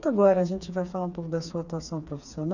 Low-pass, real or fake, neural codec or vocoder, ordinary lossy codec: 7.2 kHz; fake; codec, 16 kHz, 4 kbps, FreqCodec, smaller model; none